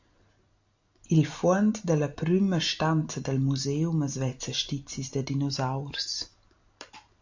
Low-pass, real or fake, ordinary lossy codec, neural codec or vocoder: 7.2 kHz; real; AAC, 48 kbps; none